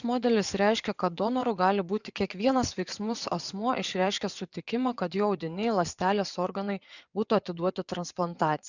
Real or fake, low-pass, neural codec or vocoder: fake; 7.2 kHz; vocoder, 24 kHz, 100 mel bands, Vocos